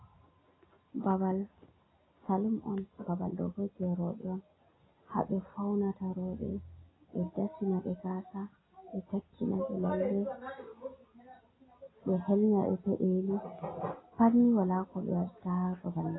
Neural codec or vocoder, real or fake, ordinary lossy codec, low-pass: none; real; AAC, 16 kbps; 7.2 kHz